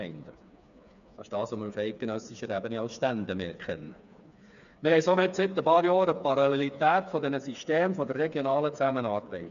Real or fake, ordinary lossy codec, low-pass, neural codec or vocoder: fake; none; 7.2 kHz; codec, 16 kHz, 4 kbps, FreqCodec, smaller model